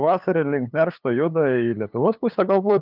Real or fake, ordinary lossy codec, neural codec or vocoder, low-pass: fake; Opus, 24 kbps; codec, 16 kHz, 8 kbps, FunCodec, trained on LibriTTS, 25 frames a second; 5.4 kHz